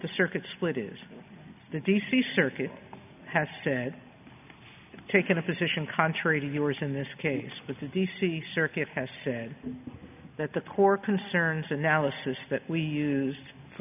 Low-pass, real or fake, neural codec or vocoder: 3.6 kHz; real; none